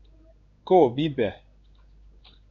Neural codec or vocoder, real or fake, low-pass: codec, 16 kHz in and 24 kHz out, 1 kbps, XY-Tokenizer; fake; 7.2 kHz